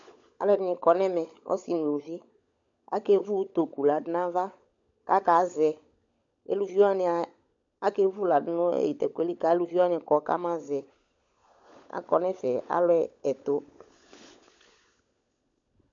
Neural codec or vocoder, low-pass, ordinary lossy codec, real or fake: codec, 16 kHz, 16 kbps, FunCodec, trained on LibriTTS, 50 frames a second; 7.2 kHz; AAC, 64 kbps; fake